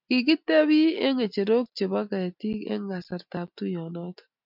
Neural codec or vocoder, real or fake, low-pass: none; real; 5.4 kHz